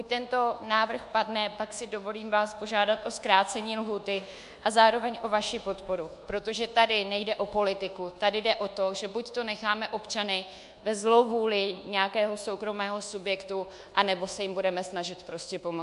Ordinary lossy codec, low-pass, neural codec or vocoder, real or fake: MP3, 64 kbps; 10.8 kHz; codec, 24 kHz, 1.2 kbps, DualCodec; fake